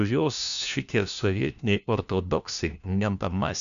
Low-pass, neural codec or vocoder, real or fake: 7.2 kHz; codec, 16 kHz, 1 kbps, FunCodec, trained on LibriTTS, 50 frames a second; fake